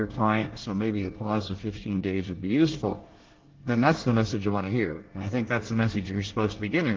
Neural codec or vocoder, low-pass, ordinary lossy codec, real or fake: codec, 24 kHz, 1 kbps, SNAC; 7.2 kHz; Opus, 16 kbps; fake